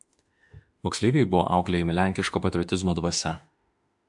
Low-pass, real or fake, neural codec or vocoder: 10.8 kHz; fake; autoencoder, 48 kHz, 32 numbers a frame, DAC-VAE, trained on Japanese speech